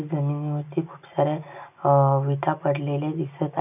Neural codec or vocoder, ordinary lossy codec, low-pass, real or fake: none; none; 3.6 kHz; real